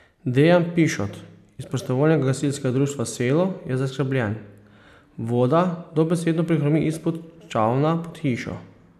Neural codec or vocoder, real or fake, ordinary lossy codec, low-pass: none; real; none; 14.4 kHz